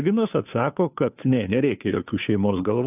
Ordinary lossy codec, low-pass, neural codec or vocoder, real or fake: AAC, 32 kbps; 3.6 kHz; codec, 16 kHz, 2 kbps, FunCodec, trained on Chinese and English, 25 frames a second; fake